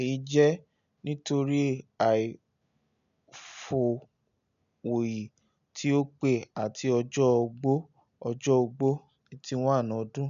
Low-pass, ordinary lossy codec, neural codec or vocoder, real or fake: 7.2 kHz; none; none; real